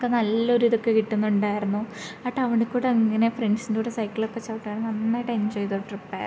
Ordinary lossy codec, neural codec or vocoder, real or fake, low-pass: none; none; real; none